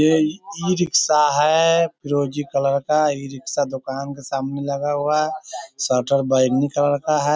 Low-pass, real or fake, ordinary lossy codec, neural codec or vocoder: none; real; none; none